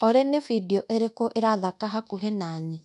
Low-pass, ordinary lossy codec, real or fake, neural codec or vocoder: 10.8 kHz; none; fake; codec, 24 kHz, 1.2 kbps, DualCodec